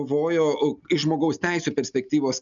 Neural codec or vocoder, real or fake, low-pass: none; real; 7.2 kHz